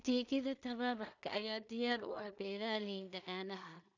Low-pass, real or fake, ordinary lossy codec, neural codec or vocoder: 7.2 kHz; fake; none; codec, 16 kHz in and 24 kHz out, 0.4 kbps, LongCat-Audio-Codec, two codebook decoder